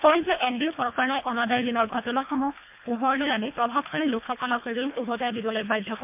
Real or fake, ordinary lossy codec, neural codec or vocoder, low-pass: fake; MP3, 32 kbps; codec, 24 kHz, 1.5 kbps, HILCodec; 3.6 kHz